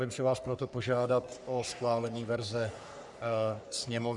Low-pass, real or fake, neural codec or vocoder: 10.8 kHz; fake; codec, 44.1 kHz, 3.4 kbps, Pupu-Codec